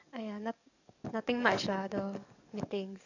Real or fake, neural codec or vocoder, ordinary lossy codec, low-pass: fake; codec, 44.1 kHz, 7.8 kbps, DAC; none; 7.2 kHz